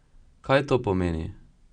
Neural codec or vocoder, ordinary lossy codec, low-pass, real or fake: none; Opus, 64 kbps; 9.9 kHz; real